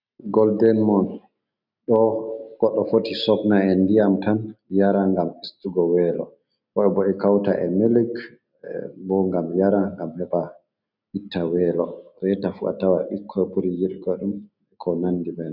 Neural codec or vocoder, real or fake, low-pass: none; real; 5.4 kHz